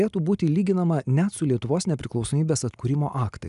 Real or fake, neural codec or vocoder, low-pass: real; none; 10.8 kHz